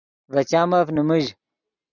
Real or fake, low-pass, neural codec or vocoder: real; 7.2 kHz; none